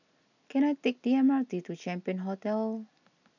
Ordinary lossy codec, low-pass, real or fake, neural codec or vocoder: none; 7.2 kHz; real; none